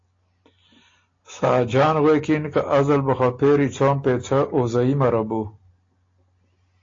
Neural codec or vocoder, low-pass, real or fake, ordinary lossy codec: none; 7.2 kHz; real; AAC, 32 kbps